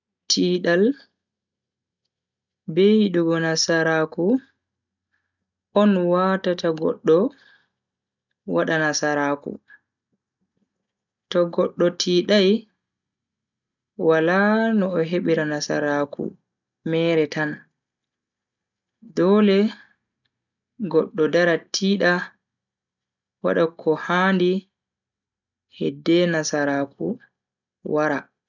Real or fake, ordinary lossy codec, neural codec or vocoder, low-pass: real; none; none; 7.2 kHz